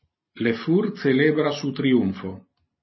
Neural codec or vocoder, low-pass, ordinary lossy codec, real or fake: none; 7.2 kHz; MP3, 24 kbps; real